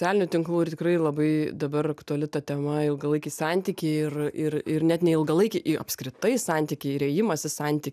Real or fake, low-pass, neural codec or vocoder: real; 14.4 kHz; none